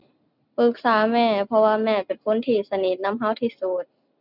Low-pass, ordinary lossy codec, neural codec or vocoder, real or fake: 5.4 kHz; none; none; real